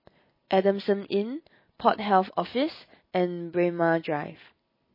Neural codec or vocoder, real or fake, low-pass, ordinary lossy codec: none; real; 5.4 kHz; MP3, 24 kbps